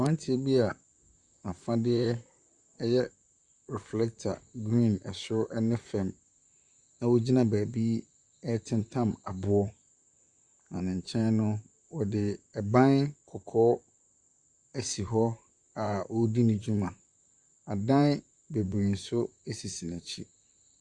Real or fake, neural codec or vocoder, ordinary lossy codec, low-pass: fake; vocoder, 44.1 kHz, 128 mel bands, Pupu-Vocoder; Opus, 64 kbps; 10.8 kHz